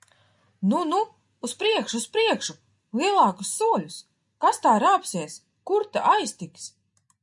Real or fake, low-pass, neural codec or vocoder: real; 10.8 kHz; none